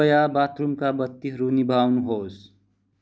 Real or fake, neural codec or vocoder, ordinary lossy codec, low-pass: real; none; none; none